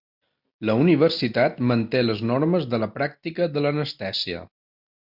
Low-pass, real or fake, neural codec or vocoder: 5.4 kHz; real; none